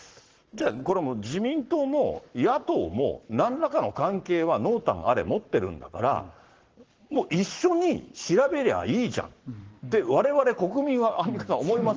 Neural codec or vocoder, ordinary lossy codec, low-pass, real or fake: codec, 44.1 kHz, 7.8 kbps, Pupu-Codec; Opus, 16 kbps; 7.2 kHz; fake